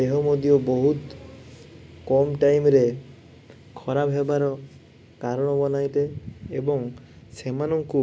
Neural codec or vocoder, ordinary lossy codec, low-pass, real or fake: none; none; none; real